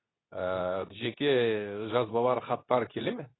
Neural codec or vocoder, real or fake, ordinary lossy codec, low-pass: codec, 24 kHz, 0.9 kbps, WavTokenizer, medium speech release version 2; fake; AAC, 16 kbps; 7.2 kHz